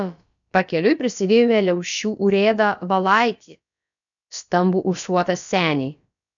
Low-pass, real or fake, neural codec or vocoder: 7.2 kHz; fake; codec, 16 kHz, about 1 kbps, DyCAST, with the encoder's durations